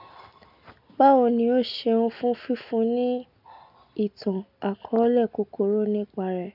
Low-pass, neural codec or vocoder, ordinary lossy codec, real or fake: 5.4 kHz; none; none; real